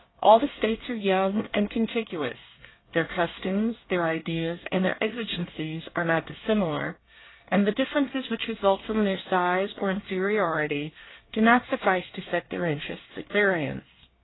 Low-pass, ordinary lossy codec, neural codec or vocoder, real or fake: 7.2 kHz; AAC, 16 kbps; codec, 24 kHz, 1 kbps, SNAC; fake